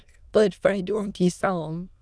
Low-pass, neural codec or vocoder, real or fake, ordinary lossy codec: none; autoencoder, 22.05 kHz, a latent of 192 numbers a frame, VITS, trained on many speakers; fake; none